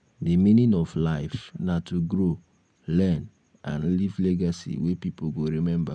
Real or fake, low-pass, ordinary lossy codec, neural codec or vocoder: real; 9.9 kHz; none; none